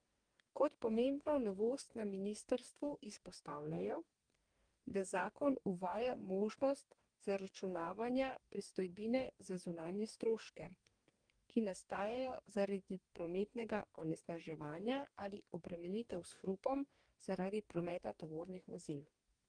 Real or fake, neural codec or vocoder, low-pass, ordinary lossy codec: fake; codec, 44.1 kHz, 2.6 kbps, DAC; 9.9 kHz; Opus, 32 kbps